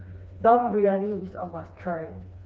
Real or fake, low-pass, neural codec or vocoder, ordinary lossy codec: fake; none; codec, 16 kHz, 2 kbps, FreqCodec, smaller model; none